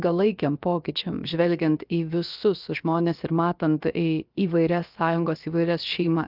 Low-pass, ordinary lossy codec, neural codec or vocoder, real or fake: 5.4 kHz; Opus, 24 kbps; codec, 16 kHz, about 1 kbps, DyCAST, with the encoder's durations; fake